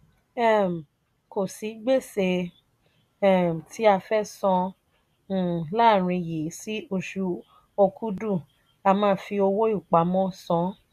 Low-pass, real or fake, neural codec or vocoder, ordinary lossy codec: 14.4 kHz; real; none; none